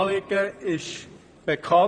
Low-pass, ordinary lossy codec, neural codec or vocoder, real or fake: 9.9 kHz; none; vocoder, 44.1 kHz, 128 mel bands, Pupu-Vocoder; fake